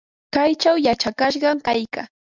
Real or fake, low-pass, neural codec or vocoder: real; 7.2 kHz; none